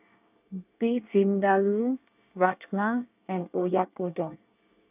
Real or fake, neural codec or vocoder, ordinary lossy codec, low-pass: fake; codec, 24 kHz, 1 kbps, SNAC; none; 3.6 kHz